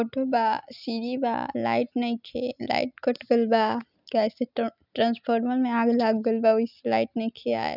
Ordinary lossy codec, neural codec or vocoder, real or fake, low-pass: none; none; real; 5.4 kHz